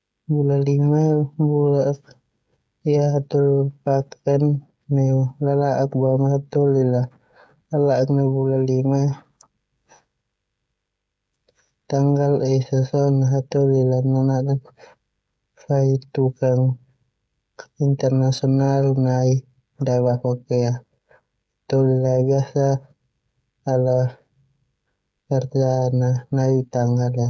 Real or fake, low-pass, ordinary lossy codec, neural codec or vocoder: fake; none; none; codec, 16 kHz, 16 kbps, FreqCodec, smaller model